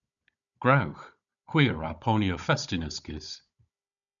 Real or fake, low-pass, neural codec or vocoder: fake; 7.2 kHz; codec, 16 kHz, 16 kbps, FunCodec, trained on Chinese and English, 50 frames a second